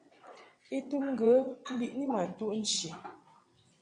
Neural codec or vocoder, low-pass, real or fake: vocoder, 22.05 kHz, 80 mel bands, WaveNeXt; 9.9 kHz; fake